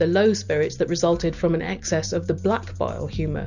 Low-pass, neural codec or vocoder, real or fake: 7.2 kHz; none; real